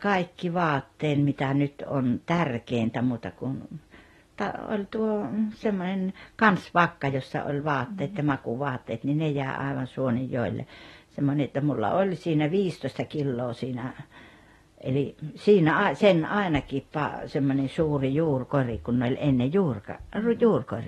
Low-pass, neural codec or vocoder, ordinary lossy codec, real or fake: 19.8 kHz; none; AAC, 32 kbps; real